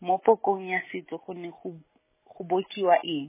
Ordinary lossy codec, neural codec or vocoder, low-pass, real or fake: MP3, 16 kbps; none; 3.6 kHz; real